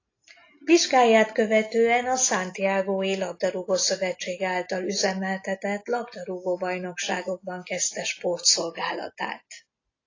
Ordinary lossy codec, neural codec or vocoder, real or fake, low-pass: AAC, 32 kbps; none; real; 7.2 kHz